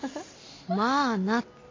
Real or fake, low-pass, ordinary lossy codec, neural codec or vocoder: real; 7.2 kHz; MP3, 32 kbps; none